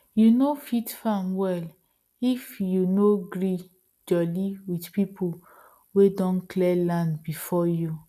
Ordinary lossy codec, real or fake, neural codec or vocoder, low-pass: none; real; none; 14.4 kHz